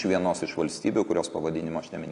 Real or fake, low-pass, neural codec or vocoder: real; 10.8 kHz; none